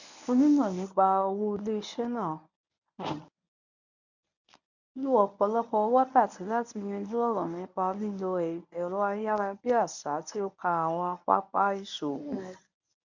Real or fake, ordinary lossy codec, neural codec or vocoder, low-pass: fake; none; codec, 24 kHz, 0.9 kbps, WavTokenizer, medium speech release version 1; 7.2 kHz